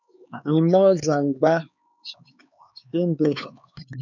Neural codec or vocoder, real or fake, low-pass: codec, 16 kHz, 4 kbps, X-Codec, HuBERT features, trained on LibriSpeech; fake; 7.2 kHz